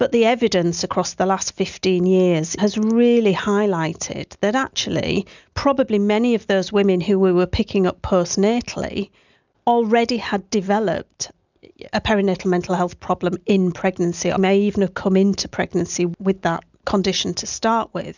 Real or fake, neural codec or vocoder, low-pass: real; none; 7.2 kHz